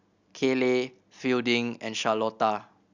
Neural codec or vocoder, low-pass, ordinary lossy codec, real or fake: none; 7.2 kHz; Opus, 64 kbps; real